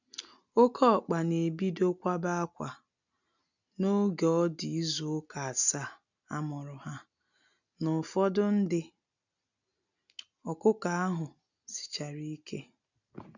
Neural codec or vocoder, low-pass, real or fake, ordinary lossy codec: none; 7.2 kHz; real; none